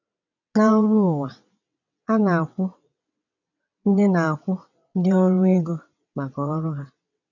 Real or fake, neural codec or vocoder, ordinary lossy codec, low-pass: fake; vocoder, 22.05 kHz, 80 mel bands, WaveNeXt; none; 7.2 kHz